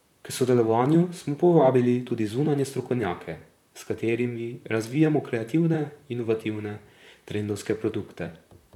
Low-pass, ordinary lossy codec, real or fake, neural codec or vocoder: 19.8 kHz; none; fake; vocoder, 44.1 kHz, 128 mel bands, Pupu-Vocoder